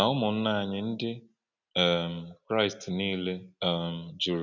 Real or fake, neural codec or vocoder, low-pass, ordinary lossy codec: real; none; 7.2 kHz; none